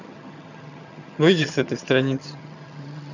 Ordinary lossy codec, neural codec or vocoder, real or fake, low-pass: none; vocoder, 22.05 kHz, 80 mel bands, HiFi-GAN; fake; 7.2 kHz